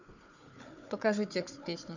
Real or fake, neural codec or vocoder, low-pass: fake; codec, 16 kHz, 4 kbps, FunCodec, trained on Chinese and English, 50 frames a second; 7.2 kHz